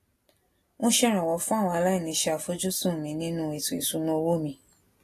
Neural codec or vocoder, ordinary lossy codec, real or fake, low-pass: none; AAC, 48 kbps; real; 14.4 kHz